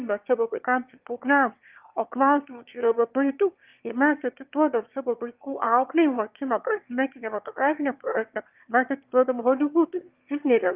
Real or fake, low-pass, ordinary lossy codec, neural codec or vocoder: fake; 3.6 kHz; Opus, 32 kbps; autoencoder, 22.05 kHz, a latent of 192 numbers a frame, VITS, trained on one speaker